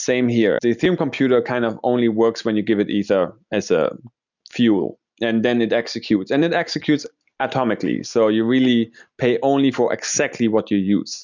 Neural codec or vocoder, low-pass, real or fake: none; 7.2 kHz; real